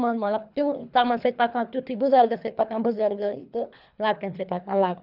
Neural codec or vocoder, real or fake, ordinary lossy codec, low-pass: codec, 24 kHz, 3 kbps, HILCodec; fake; none; 5.4 kHz